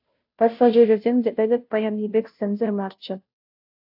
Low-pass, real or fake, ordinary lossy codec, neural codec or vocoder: 5.4 kHz; fake; AAC, 48 kbps; codec, 16 kHz, 0.5 kbps, FunCodec, trained on Chinese and English, 25 frames a second